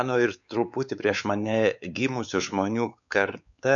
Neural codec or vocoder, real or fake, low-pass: codec, 16 kHz, 4 kbps, X-Codec, WavLM features, trained on Multilingual LibriSpeech; fake; 7.2 kHz